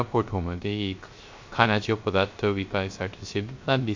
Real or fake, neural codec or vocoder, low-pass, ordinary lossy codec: fake; codec, 16 kHz, 0.3 kbps, FocalCodec; 7.2 kHz; MP3, 48 kbps